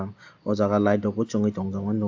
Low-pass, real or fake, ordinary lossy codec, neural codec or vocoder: 7.2 kHz; real; AAC, 48 kbps; none